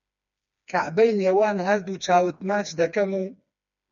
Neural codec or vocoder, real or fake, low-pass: codec, 16 kHz, 2 kbps, FreqCodec, smaller model; fake; 7.2 kHz